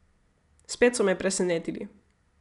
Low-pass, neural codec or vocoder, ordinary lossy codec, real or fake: 10.8 kHz; none; none; real